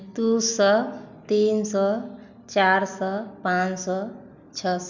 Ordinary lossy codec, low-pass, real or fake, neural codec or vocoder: none; 7.2 kHz; real; none